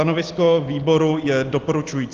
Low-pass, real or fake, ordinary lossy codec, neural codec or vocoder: 7.2 kHz; real; Opus, 16 kbps; none